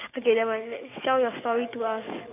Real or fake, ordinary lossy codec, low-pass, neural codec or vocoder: fake; none; 3.6 kHz; codec, 44.1 kHz, 7.8 kbps, DAC